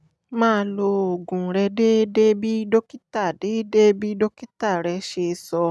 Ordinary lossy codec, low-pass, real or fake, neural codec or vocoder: none; none; real; none